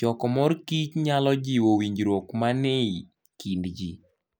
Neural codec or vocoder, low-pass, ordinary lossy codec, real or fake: none; none; none; real